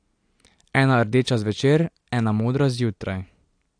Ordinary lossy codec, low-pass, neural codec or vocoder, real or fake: AAC, 64 kbps; 9.9 kHz; none; real